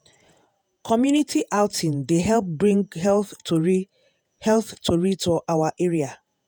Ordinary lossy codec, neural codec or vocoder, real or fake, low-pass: none; vocoder, 48 kHz, 128 mel bands, Vocos; fake; none